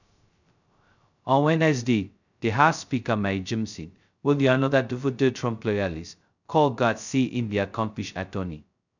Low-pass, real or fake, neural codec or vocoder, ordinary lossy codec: 7.2 kHz; fake; codec, 16 kHz, 0.2 kbps, FocalCodec; none